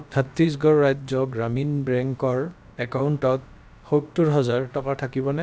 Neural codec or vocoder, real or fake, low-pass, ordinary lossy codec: codec, 16 kHz, about 1 kbps, DyCAST, with the encoder's durations; fake; none; none